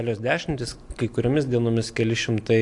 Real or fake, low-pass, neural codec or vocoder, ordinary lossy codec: real; 10.8 kHz; none; AAC, 64 kbps